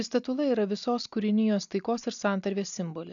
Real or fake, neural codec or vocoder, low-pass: real; none; 7.2 kHz